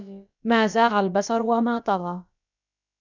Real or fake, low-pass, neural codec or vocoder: fake; 7.2 kHz; codec, 16 kHz, about 1 kbps, DyCAST, with the encoder's durations